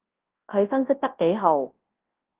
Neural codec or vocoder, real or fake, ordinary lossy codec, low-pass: codec, 24 kHz, 0.9 kbps, WavTokenizer, large speech release; fake; Opus, 16 kbps; 3.6 kHz